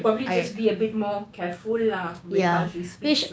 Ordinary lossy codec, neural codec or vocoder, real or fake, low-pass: none; codec, 16 kHz, 6 kbps, DAC; fake; none